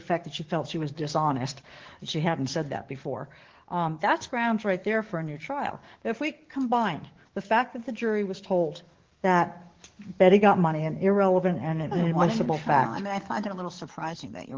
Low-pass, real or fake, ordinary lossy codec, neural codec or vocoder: 7.2 kHz; fake; Opus, 16 kbps; codec, 44.1 kHz, 7.8 kbps, DAC